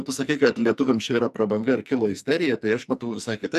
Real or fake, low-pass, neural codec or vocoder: fake; 14.4 kHz; codec, 44.1 kHz, 2.6 kbps, SNAC